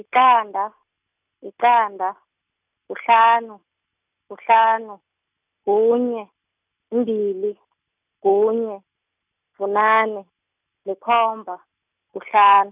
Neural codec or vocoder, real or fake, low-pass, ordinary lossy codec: none; real; 3.6 kHz; none